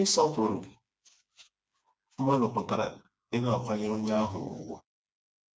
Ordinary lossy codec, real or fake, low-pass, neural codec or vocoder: none; fake; none; codec, 16 kHz, 2 kbps, FreqCodec, smaller model